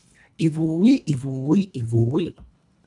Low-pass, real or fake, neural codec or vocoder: 10.8 kHz; fake; codec, 24 kHz, 1.5 kbps, HILCodec